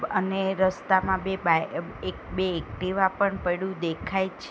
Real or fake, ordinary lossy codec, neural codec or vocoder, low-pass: real; none; none; none